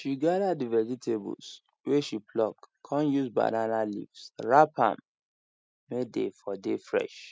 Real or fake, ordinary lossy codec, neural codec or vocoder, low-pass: real; none; none; none